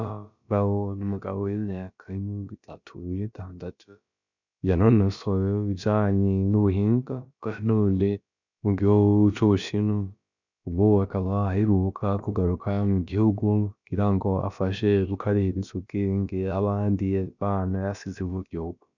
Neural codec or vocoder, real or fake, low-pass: codec, 16 kHz, about 1 kbps, DyCAST, with the encoder's durations; fake; 7.2 kHz